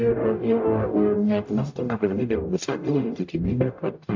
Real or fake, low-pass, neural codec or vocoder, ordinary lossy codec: fake; 7.2 kHz; codec, 44.1 kHz, 0.9 kbps, DAC; AAC, 48 kbps